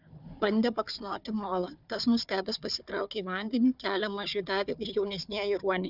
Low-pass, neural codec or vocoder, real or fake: 5.4 kHz; codec, 16 kHz, 4 kbps, FunCodec, trained on Chinese and English, 50 frames a second; fake